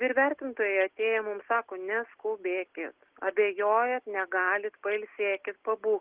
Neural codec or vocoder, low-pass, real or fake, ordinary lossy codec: none; 3.6 kHz; real; Opus, 16 kbps